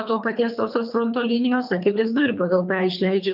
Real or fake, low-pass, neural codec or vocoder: fake; 5.4 kHz; codec, 24 kHz, 3 kbps, HILCodec